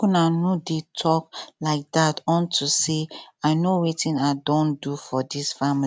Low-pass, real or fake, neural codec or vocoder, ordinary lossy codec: none; real; none; none